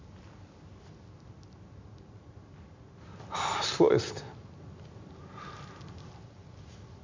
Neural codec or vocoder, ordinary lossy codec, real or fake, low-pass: none; MP3, 64 kbps; real; 7.2 kHz